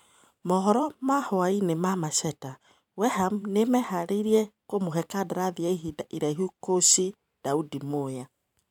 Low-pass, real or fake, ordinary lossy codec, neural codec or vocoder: 19.8 kHz; fake; none; vocoder, 44.1 kHz, 128 mel bands every 512 samples, BigVGAN v2